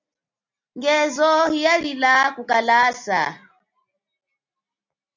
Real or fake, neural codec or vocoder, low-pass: real; none; 7.2 kHz